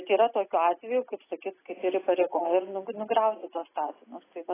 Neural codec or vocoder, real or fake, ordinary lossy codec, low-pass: none; real; AAC, 16 kbps; 3.6 kHz